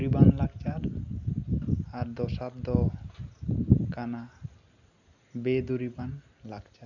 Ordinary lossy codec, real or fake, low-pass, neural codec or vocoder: none; real; 7.2 kHz; none